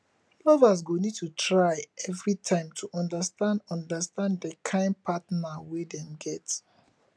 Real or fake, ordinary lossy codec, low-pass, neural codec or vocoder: real; none; none; none